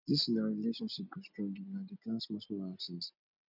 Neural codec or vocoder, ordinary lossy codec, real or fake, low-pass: none; none; real; 5.4 kHz